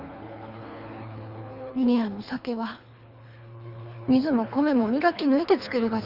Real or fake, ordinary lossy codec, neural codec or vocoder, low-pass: fake; Opus, 64 kbps; codec, 24 kHz, 3 kbps, HILCodec; 5.4 kHz